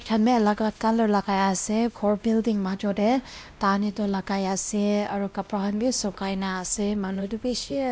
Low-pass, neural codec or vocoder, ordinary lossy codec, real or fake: none; codec, 16 kHz, 1 kbps, X-Codec, WavLM features, trained on Multilingual LibriSpeech; none; fake